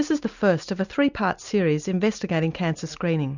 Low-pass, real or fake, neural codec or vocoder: 7.2 kHz; real; none